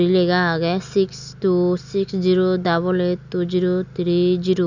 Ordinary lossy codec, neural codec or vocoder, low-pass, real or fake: none; none; 7.2 kHz; real